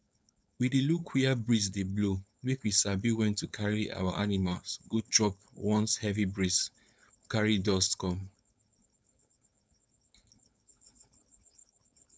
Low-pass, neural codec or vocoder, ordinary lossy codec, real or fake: none; codec, 16 kHz, 4.8 kbps, FACodec; none; fake